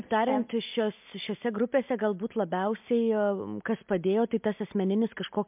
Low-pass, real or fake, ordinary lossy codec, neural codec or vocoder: 3.6 kHz; real; MP3, 32 kbps; none